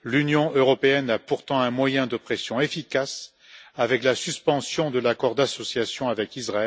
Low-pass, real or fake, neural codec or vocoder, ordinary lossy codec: none; real; none; none